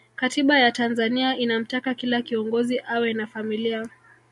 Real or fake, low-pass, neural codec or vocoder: real; 10.8 kHz; none